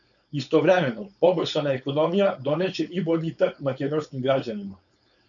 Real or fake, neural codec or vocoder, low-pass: fake; codec, 16 kHz, 4.8 kbps, FACodec; 7.2 kHz